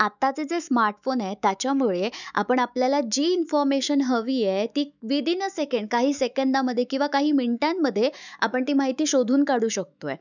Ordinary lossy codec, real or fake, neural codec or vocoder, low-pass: none; fake; autoencoder, 48 kHz, 128 numbers a frame, DAC-VAE, trained on Japanese speech; 7.2 kHz